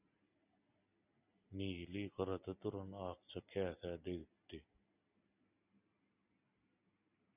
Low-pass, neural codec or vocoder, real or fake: 3.6 kHz; none; real